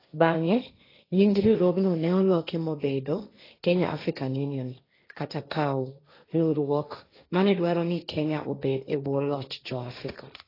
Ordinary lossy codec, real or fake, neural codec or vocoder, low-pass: AAC, 24 kbps; fake; codec, 16 kHz, 1.1 kbps, Voila-Tokenizer; 5.4 kHz